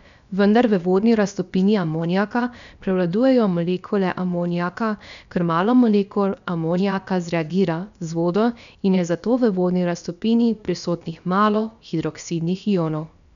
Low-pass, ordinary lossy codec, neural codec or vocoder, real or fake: 7.2 kHz; none; codec, 16 kHz, about 1 kbps, DyCAST, with the encoder's durations; fake